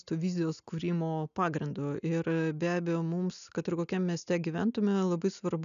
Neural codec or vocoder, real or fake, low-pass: none; real; 7.2 kHz